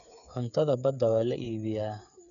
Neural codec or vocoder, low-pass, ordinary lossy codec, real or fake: codec, 16 kHz, 8 kbps, FreqCodec, smaller model; 7.2 kHz; none; fake